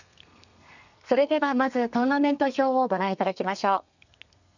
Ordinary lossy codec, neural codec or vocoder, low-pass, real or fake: none; codec, 32 kHz, 1.9 kbps, SNAC; 7.2 kHz; fake